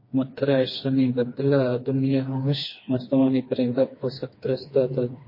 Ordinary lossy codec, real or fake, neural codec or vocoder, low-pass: MP3, 24 kbps; fake; codec, 16 kHz, 2 kbps, FreqCodec, smaller model; 5.4 kHz